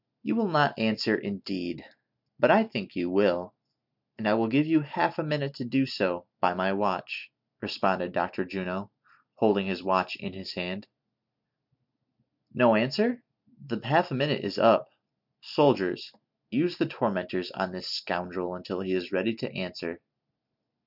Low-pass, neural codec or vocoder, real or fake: 5.4 kHz; none; real